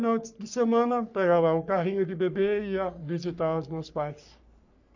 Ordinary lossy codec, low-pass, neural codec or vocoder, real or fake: none; 7.2 kHz; codec, 44.1 kHz, 3.4 kbps, Pupu-Codec; fake